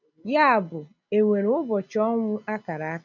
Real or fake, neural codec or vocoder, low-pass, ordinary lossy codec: real; none; 7.2 kHz; none